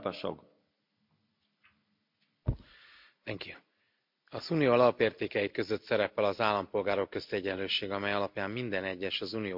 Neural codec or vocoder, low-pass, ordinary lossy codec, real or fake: none; 5.4 kHz; MP3, 48 kbps; real